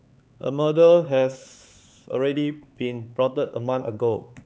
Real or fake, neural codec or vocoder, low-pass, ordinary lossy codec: fake; codec, 16 kHz, 4 kbps, X-Codec, HuBERT features, trained on LibriSpeech; none; none